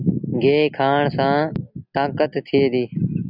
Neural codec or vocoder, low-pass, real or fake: none; 5.4 kHz; real